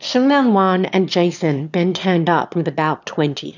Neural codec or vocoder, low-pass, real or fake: autoencoder, 22.05 kHz, a latent of 192 numbers a frame, VITS, trained on one speaker; 7.2 kHz; fake